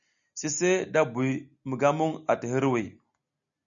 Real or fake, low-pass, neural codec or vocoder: real; 7.2 kHz; none